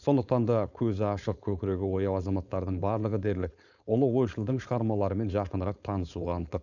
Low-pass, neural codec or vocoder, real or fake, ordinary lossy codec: 7.2 kHz; codec, 16 kHz, 4.8 kbps, FACodec; fake; none